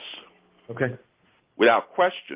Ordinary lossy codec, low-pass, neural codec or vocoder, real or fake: Opus, 32 kbps; 3.6 kHz; none; real